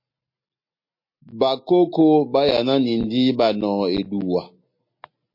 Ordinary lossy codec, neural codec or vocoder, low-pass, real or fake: MP3, 32 kbps; none; 5.4 kHz; real